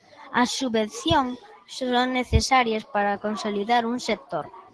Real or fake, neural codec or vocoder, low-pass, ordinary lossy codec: real; none; 10.8 kHz; Opus, 16 kbps